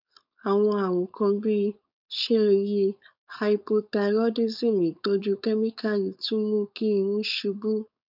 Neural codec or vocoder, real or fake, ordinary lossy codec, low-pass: codec, 16 kHz, 4.8 kbps, FACodec; fake; none; 5.4 kHz